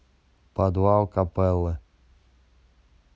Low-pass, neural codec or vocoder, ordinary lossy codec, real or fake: none; none; none; real